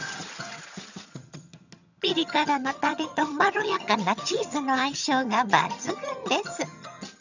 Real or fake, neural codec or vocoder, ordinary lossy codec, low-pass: fake; vocoder, 22.05 kHz, 80 mel bands, HiFi-GAN; none; 7.2 kHz